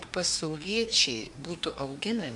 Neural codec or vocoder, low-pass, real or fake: codec, 24 kHz, 1 kbps, SNAC; 10.8 kHz; fake